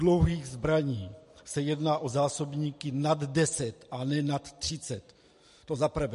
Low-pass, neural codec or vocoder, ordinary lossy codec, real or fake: 14.4 kHz; none; MP3, 48 kbps; real